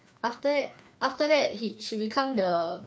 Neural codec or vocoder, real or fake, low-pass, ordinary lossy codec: codec, 16 kHz, 2 kbps, FreqCodec, larger model; fake; none; none